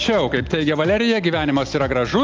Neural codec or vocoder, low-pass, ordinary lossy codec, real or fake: none; 7.2 kHz; Opus, 24 kbps; real